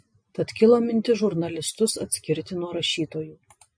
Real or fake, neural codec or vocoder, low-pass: real; none; 9.9 kHz